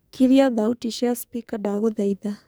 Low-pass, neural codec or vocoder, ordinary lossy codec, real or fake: none; codec, 44.1 kHz, 2.6 kbps, SNAC; none; fake